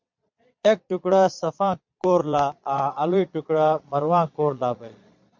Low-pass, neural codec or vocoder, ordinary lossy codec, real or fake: 7.2 kHz; vocoder, 22.05 kHz, 80 mel bands, Vocos; MP3, 64 kbps; fake